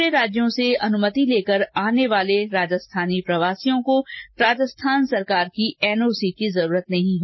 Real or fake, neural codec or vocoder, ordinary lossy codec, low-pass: real; none; MP3, 24 kbps; 7.2 kHz